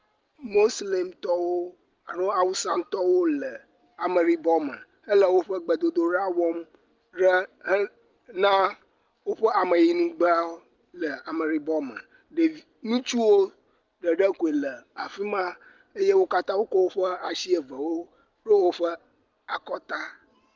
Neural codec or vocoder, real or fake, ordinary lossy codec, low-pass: none; real; Opus, 24 kbps; 7.2 kHz